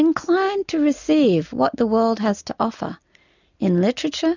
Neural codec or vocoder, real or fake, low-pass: none; real; 7.2 kHz